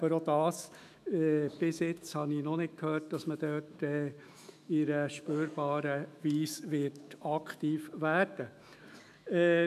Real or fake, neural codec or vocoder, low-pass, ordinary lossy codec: fake; autoencoder, 48 kHz, 128 numbers a frame, DAC-VAE, trained on Japanese speech; 14.4 kHz; none